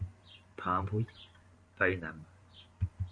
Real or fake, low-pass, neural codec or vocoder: fake; 9.9 kHz; vocoder, 44.1 kHz, 128 mel bands every 512 samples, BigVGAN v2